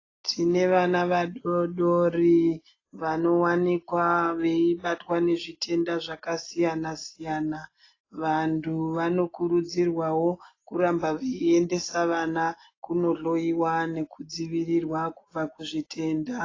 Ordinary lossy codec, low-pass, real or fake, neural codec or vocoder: AAC, 32 kbps; 7.2 kHz; real; none